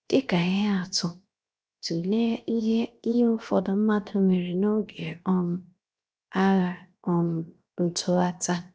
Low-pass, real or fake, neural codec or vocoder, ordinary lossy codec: none; fake; codec, 16 kHz, 0.7 kbps, FocalCodec; none